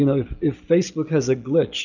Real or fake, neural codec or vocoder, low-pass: real; none; 7.2 kHz